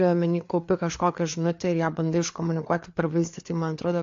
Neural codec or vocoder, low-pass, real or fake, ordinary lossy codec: codec, 16 kHz, 4 kbps, FreqCodec, larger model; 7.2 kHz; fake; AAC, 48 kbps